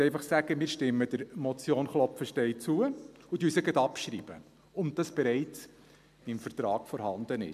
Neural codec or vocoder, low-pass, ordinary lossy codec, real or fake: none; 14.4 kHz; MP3, 96 kbps; real